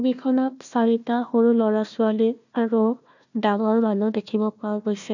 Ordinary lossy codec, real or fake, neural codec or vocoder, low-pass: none; fake; codec, 16 kHz, 1 kbps, FunCodec, trained on Chinese and English, 50 frames a second; 7.2 kHz